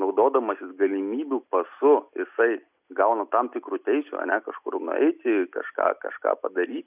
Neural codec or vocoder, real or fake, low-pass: none; real; 3.6 kHz